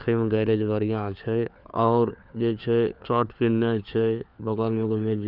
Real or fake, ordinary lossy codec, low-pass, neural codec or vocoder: fake; none; 5.4 kHz; codec, 16 kHz, 4 kbps, FunCodec, trained on LibriTTS, 50 frames a second